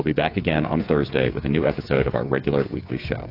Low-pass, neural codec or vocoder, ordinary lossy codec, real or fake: 5.4 kHz; codec, 16 kHz, 8 kbps, FreqCodec, smaller model; AAC, 32 kbps; fake